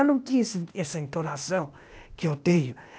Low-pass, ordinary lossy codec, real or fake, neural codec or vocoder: none; none; fake; codec, 16 kHz, 0.8 kbps, ZipCodec